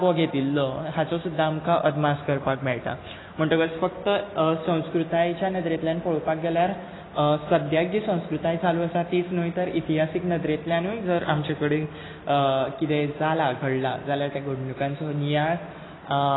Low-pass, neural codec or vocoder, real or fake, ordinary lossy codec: 7.2 kHz; none; real; AAC, 16 kbps